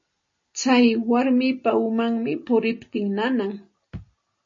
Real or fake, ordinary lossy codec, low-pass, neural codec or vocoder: real; MP3, 32 kbps; 7.2 kHz; none